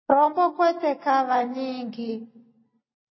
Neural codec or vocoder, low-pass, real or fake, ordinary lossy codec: none; 7.2 kHz; real; MP3, 24 kbps